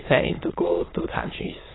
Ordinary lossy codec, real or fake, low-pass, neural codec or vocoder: AAC, 16 kbps; fake; 7.2 kHz; autoencoder, 22.05 kHz, a latent of 192 numbers a frame, VITS, trained on many speakers